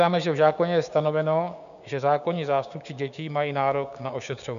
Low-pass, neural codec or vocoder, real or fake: 7.2 kHz; codec, 16 kHz, 6 kbps, DAC; fake